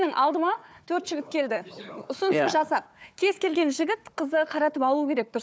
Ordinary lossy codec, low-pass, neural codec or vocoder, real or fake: none; none; codec, 16 kHz, 4 kbps, FunCodec, trained on Chinese and English, 50 frames a second; fake